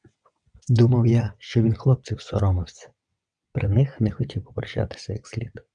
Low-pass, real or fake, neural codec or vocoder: 9.9 kHz; fake; vocoder, 22.05 kHz, 80 mel bands, WaveNeXt